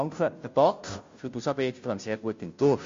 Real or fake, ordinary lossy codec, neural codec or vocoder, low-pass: fake; MP3, 48 kbps; codec, 16 kHz, 0.5 kbps, FunCodec, trained on Chinese and English, 25 frames a second; 7.2 kHz